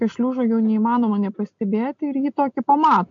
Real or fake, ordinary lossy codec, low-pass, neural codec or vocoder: real; MP3, 48 kbps; 7.2 kHz; none